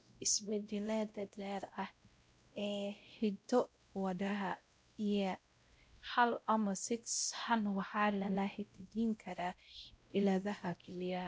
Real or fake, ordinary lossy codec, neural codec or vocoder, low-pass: fake; none; codec, 16 kHz, 0.5 kbps, X-Codec, WavLM features, trained on Multilingual LibriSpeech; none